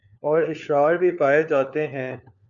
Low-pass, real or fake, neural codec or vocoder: 7.2 kHz; fake; codec, 16 kHz, 4 kbps, FunCodec, trained on LibriTTS, 50 frames a second